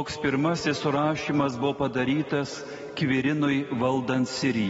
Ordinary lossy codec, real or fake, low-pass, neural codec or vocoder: AAC, 24 kbps; real; 19.8 kHz; none